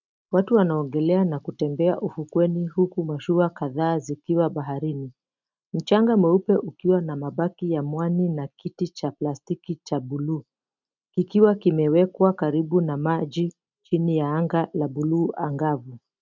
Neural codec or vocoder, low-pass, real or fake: none; 7.2 kHz; real